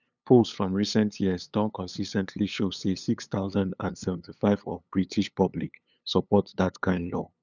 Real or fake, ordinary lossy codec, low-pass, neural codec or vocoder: fake; none; 7.2 kHz; codec, 16 kHz, 8 kbps, FunCodec, trained on LibriTTS, 25 frames a second